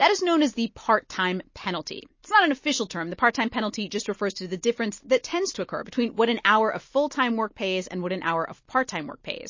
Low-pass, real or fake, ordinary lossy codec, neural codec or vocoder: 7.2 kHz; real; MP3, 32 kbps; none